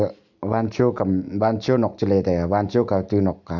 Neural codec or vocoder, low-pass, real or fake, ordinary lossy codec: none; 7.2 kHz; real; none